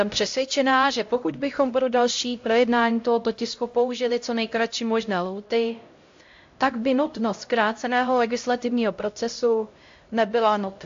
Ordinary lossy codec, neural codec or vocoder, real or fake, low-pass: AAC, 48 kbps; codec, 16 kHz, 0.5 kbps, X-Codec, HuBERT features, trained on LibriSpeech; fake; 7.2 kHz